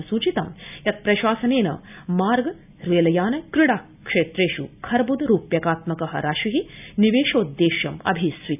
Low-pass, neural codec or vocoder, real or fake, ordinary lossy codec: 3.6 kHz; none; real; none